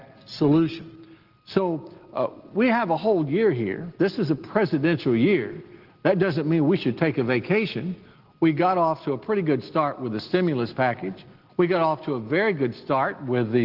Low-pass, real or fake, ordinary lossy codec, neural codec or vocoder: 5.4 kHz; real; Opus, 16 kbps; none